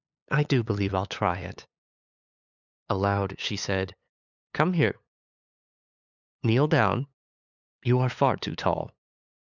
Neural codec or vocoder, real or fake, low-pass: codec, 16 kHz, 8 kbps, FunCodec, trained on LibriTTS, 25 frames a second; fake; 7.2 kHz